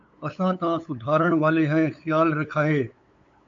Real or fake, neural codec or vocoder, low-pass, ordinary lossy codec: fake; codec, 16 kHz, 8 kbps, FunCodec, trained on LibriTTS, 25 frames a second; 7.2 kHz; MP3, 48 kbps